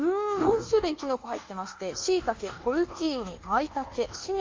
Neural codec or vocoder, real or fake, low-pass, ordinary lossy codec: codec, 24 kHz, 1.2 kbps, DualCodec; fake; 7.2 kHz; Opus, 32 kbps